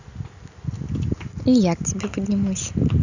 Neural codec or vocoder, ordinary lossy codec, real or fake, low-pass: none; none; real; 7.2 kHz